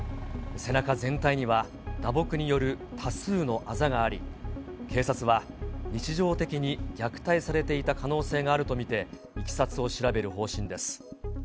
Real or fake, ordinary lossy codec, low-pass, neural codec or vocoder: real; none; none; none